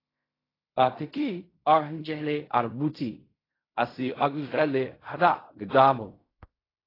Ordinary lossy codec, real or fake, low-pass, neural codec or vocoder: AAC, 24 kbps; fake; 5.4 kHz; codec, 16 kHz in and 24 kHz out, 0.4 kbps, LongCat-Audio-Codec, fine tuned four codebook decoder